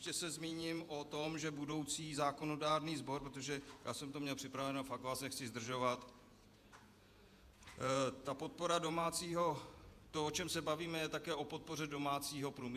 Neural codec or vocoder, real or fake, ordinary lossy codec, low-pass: vocoder, 48 kHz, 128 mel bands, Vocos; fake; AAC, 96 kbps; 14.4 kHz